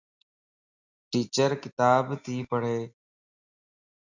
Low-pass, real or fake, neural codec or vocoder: 7.2 kHz; real; none